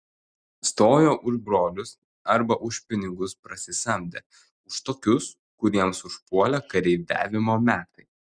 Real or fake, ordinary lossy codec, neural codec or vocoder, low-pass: real; Opus, 64 kbps; none; 9.9 kHz